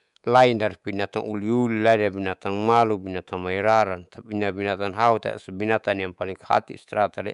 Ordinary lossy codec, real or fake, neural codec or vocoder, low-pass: none; fake; codec, 24 kHz, 3.1 kbps, DualCodec; 10.8 kHz